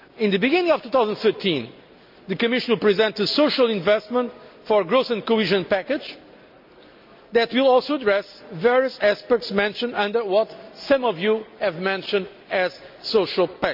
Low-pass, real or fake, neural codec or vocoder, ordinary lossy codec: 5.4 kHz; real; none; MP3, 48 kbps